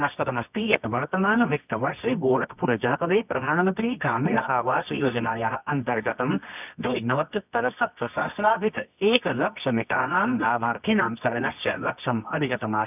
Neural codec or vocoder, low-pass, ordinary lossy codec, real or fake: codec, 24 kHz, 0.9 kbps, WavTokenizer, medium music audio release; 3.6 kHz; none; fake